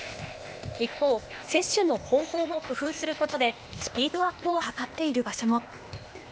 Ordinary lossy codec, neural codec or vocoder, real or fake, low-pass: none; codec, 16 kHz, 0.8 kbps, ZipCodec; fake; none